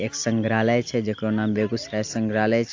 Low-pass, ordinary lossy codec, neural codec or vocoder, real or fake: 7.2 kHz; AAC, 48 kbps; none; real